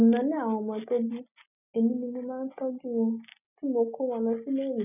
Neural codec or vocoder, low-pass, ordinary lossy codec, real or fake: none; 3.6 kHz; none; real